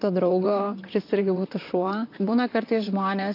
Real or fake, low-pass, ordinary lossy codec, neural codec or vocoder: fake; 5.4 kHz; AAC, 32 kbps; vocoder, 44.1 kHz, 128 mel bands, Pupu-Vocoder